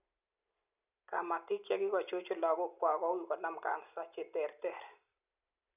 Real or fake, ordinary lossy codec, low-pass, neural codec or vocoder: real; none; 3.6 kHz; none